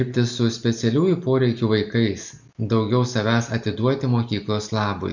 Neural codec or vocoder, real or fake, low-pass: none; real; 7.2 kHz